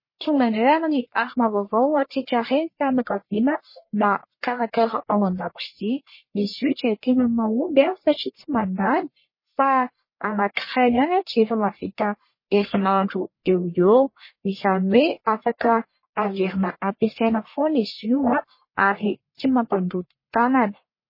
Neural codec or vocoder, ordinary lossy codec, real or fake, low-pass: codec, 44.1 kHz, 1.7 kbps, Pupu-Codec; MP3, 24 kbps; fake; 5.4 kHz